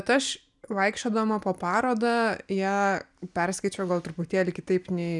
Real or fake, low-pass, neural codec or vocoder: real; 10.8 kHz; none